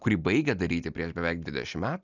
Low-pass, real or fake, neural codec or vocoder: 7.2 kHz; real; none